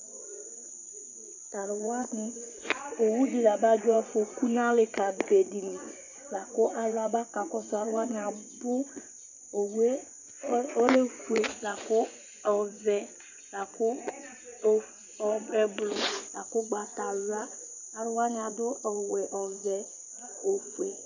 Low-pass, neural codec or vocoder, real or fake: 7.2 kHz; vocoder, 24 kHz, 100 mel bands, Vocos; fake